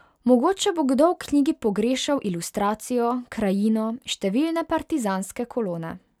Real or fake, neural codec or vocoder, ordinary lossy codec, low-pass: real; none; none; 19.8 kHz